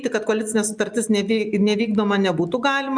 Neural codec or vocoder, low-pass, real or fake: none; 9.9 kHz; real